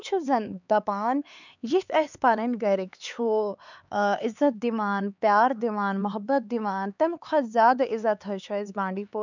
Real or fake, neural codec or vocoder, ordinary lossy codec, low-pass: fake; codec, 16 kHz, 4 kbps, X-Codec, HuBERT features, trained on LibriSpeech; none; 7.2 kHz